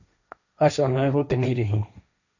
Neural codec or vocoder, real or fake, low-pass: codec, 16 kHz, 1.1 kbps, Voila-Tokenizer; fake; 7.2 kHz